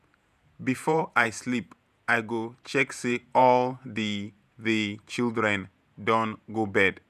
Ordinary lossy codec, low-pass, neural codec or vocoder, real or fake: none; 14.4 kHz; vocoder, 48 kHz, 128 mel bands, Vocos; fake